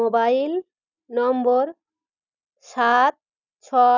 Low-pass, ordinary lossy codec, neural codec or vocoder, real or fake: 7.2 kHz; none; none; real